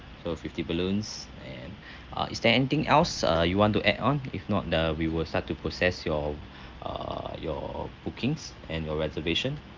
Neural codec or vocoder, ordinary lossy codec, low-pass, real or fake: none; Opus, 24 kbps; 7.2 kHz; real